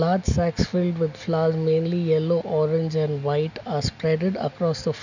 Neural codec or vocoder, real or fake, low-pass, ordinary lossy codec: none; real; 7.2 kHz; none